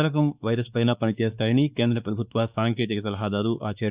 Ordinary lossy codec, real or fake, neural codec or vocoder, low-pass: Opus, 64 kbps; fake; codec, 16 kHz, 2 kbps, X-Codec, WavLM features, trained on Multilingual LibriSpeech; 3.6 kHz